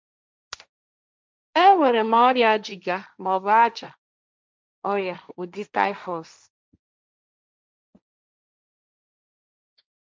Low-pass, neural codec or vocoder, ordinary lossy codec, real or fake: none; codec, 16 kHz, 1.1 kbps, Voila-Tokenizer; none; fake